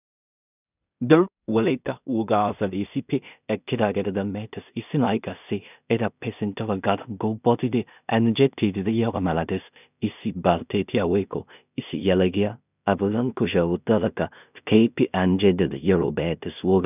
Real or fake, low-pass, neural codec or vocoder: fake; 3.6 kHz; codec, 16 kHz in and 24 kHz out, 0.4 kbps, LongCat-Audio-Codec, two codebook decoder